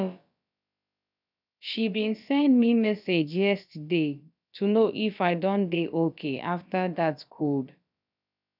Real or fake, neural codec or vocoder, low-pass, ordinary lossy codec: fake; codec, 16 kHz, about 1 kbps, DyCAST, with the encoder's durations; 5.4 kHz; none